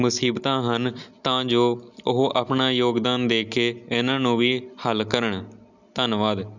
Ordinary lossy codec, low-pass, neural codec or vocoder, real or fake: none; 7.2 kHz; none; real